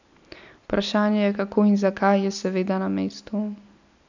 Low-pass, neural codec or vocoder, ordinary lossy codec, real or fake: 7.2 kHz; none; none; real